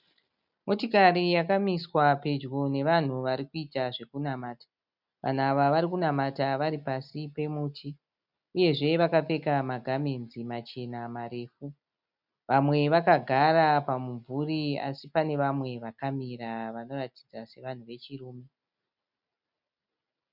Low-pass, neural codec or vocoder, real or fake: 5.4 kHz; none; real